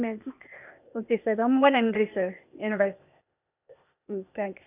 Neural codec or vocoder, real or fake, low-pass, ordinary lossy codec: codec, 16 kHz, 0.8 kbps, ZipCodec; fake; 3.6 kHz; none